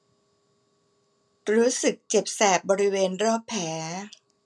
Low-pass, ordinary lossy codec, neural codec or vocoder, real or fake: none; none; none; real